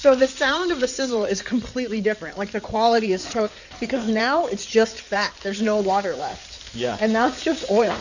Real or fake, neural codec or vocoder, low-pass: fake; codec, 16 kHz in and 24 kHz out, 2.2 kbps, FireRedTTS-2 codec; 7.2 kHz